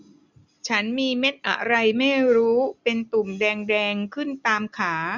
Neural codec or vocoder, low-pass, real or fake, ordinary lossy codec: none; 7.2 kHz; real; none